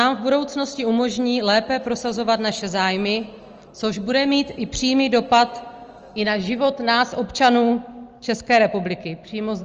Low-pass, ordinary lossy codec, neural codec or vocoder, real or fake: 7.2 kHz; Opus, 24 kbps; none; real